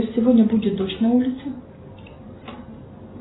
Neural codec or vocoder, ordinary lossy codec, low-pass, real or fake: none; AAC, 16 kbps; 7.2 kHz; real